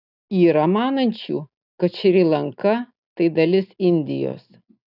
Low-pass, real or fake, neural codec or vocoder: 5.4 kHz; real; none